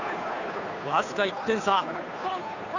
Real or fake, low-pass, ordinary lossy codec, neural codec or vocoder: fake; 7.2 kHz; none; codec, 16 kHz, 2 kbps, FunCodec, trained on Chinese and English, 25 frames a second